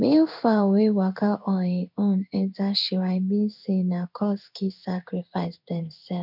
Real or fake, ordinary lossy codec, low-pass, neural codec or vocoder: fake; none; 5.4 kHz; codec, 16 kHz in and 24 kHz out, 1 kbps, XY-Tokenizer